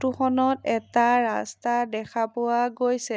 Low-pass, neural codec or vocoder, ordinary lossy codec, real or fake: none; none; none; real